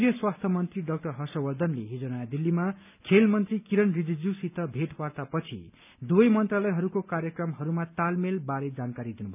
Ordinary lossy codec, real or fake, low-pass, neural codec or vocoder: none; real; 3.6 kHz; none